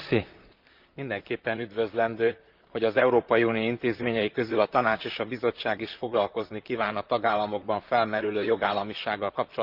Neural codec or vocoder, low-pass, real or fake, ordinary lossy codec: vocoder, 44.1 kHz, 128 mel bands, Pupu-Vocoder; 5.4 kHz; fake; Opus, 24 kbps